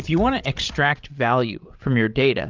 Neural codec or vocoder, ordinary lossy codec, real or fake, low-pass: codec, 16 kHz, 16 kbps, FreqCodec, larger model; Opus, 24 kbps; fake; 7.2 kHz